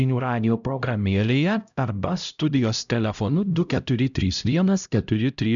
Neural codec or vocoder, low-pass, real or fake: codec, 16 kHz, 0.5 kbps, X-Codec, HuBERT features, trained on LibriSpeech; 7.2 kHz; fake